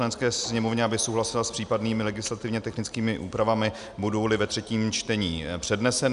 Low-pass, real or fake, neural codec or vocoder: 10.8 kHz; real; none